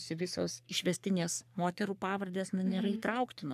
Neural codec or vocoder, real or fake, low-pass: codec, 44.1 kHz, 3.4 kbps, Pupu-Codec; fake; 14.4 kHz